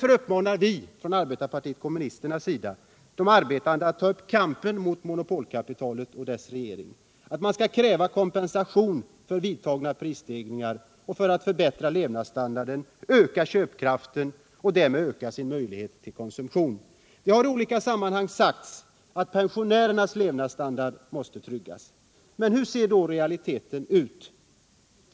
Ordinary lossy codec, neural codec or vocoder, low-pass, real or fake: none; none; none; real